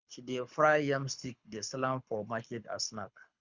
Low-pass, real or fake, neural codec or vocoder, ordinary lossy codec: 7.2 kHz; fake; codec, 24 kHz, 6 kbps, HILCodec; Opus, 64 kbps